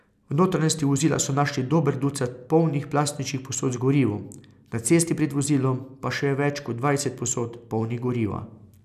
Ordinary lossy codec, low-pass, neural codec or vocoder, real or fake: none; 14.4 kHz; none; real